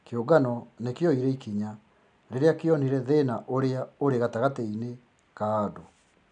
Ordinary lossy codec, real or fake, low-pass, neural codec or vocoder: none; real; 9.9 kHz; none